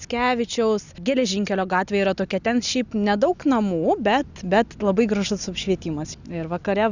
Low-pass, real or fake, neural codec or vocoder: 7.2 kHz; real; none